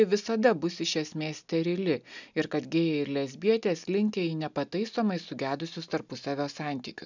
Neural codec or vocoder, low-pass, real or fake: none; 7.2 kHz; real